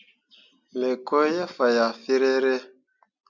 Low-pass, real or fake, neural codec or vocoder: 7.2 kHz; real; none